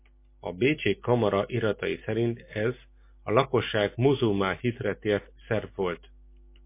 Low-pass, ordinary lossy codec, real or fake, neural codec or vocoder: 3.6 kHz; MP3, 24 kbps; real; none